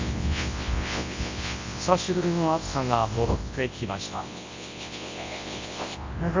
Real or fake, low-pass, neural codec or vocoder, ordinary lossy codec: fake; 7.2 kHz; codec, 24 kHz, 0.9 kbps, WavTokenizer, large speech release; MP3, 48 kbps